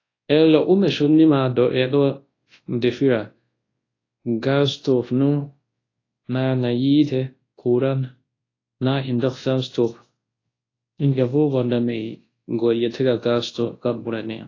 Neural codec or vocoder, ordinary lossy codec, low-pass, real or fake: codec, 24 kHz, 0.9 kbps, WavTokenizer, large speech release; AAC, 32 kbps; 7.2 kHz; fake